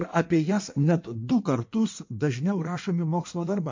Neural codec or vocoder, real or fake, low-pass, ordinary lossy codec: codec, 16 kHz in and 24 kHz out, 1.1 kbps, FireRedTTS-2 codec; fake; 7.2 kHz; MP3, 48 kbps